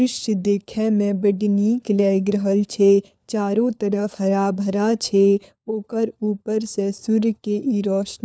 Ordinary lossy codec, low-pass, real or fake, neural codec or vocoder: none; none; fake; codec, 16 kHz, 4 kbps, FunCodec, trained on LibriTTS, 50 frames a second